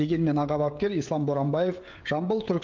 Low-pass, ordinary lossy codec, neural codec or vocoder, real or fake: 7.2 kHz; Opus, 32 kbps; codec, 16 kHz, 16 kbps, FreqCodec, smaller model; fake